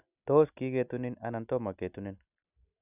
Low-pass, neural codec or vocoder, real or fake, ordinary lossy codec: 3.6 kHz; none; real; none